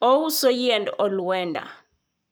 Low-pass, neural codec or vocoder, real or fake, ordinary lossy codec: none; codec, 44.1 kHz, 7.8 kbps, Pupu-Codec; fake; none